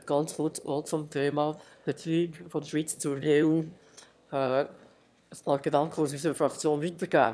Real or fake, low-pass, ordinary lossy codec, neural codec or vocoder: fake; none; none; autoencoder, 22.05 kHz, a latent of 192 numbers a frame, VITS, trained on one speaker